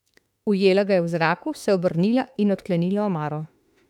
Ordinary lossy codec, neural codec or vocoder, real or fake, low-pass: none; autoencoder, 48 kHz, 32 numbers a frame, DAC-VAE, trained on Japanese speech; fake; 19.8 kHz